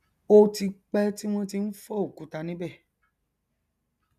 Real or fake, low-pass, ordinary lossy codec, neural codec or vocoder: real; 14.4 kHz; none; none